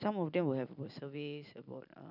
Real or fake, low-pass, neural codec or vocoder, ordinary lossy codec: fake; 5.4 kHz; vocoder, 44.1 kHz, 128 mel bands every 512 samples, BigVGAN v2; none